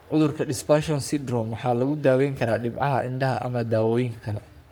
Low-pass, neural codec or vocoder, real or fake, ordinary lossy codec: none; codec, 44.1 kHz, 3.4 kbps, Pupu-Codec; fake; none